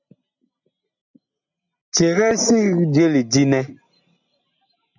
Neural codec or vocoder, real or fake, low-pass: none; real; 7.2 kHz